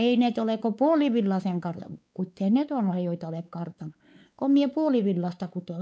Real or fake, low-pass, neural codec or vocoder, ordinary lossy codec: fake; none; codec, 16 kHz, 4 kbps, X-Codec, WavLM features, trained on Multilingual LibriSpeech; none